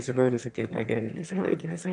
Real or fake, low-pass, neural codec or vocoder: fake; 9.9 kHz; autoencoder, 22.05 kHz, a latent of 192 numbers a frame, VITS, trained on one speaker